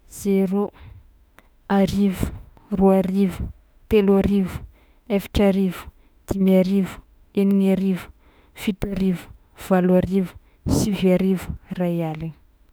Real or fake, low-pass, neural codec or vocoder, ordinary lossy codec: fake; none; autoencoder, 48 kHz, 32 numbers a frame, DAC-VAE, trained on Japanese speech; none